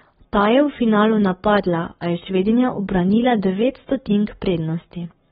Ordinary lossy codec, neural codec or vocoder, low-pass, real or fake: AAC, 16 kbps; codec, 44.1 kHz, 7.8 kbps, Pupu-Codec; 19.8 kHz; fake